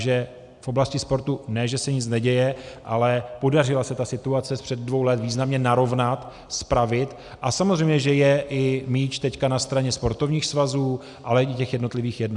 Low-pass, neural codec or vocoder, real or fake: 10.8 kHz; none; real